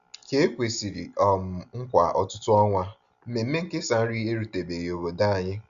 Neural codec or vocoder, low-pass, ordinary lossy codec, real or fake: none; 7.2 kHz; Opus, 64 kbps; real